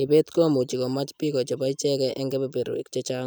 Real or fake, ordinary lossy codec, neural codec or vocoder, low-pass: real; none; none; none